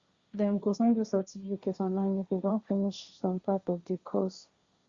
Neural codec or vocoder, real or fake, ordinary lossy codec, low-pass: codec, 16 kHz, 1.1 kbps, Voila-Tokenizer; fake; Opus, 64 kbps; 7.2 kHz